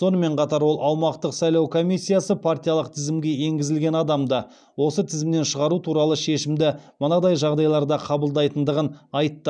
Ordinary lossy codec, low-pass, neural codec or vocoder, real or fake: none; 9.9 kHz; none; real